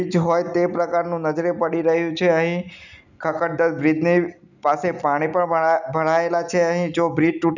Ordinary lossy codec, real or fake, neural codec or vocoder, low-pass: none; real; none; 7.2 kHz